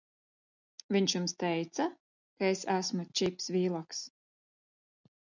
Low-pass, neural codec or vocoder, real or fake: 7.2 kHz; none; real